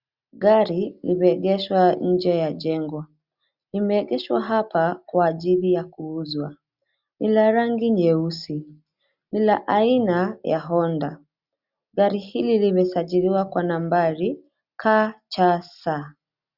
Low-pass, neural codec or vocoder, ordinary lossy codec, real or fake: 5.4 kHz; none; Opus, 64 kbps; real